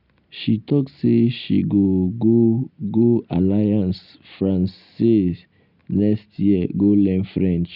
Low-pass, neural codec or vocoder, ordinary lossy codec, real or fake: 5.4 kHz; none; none; real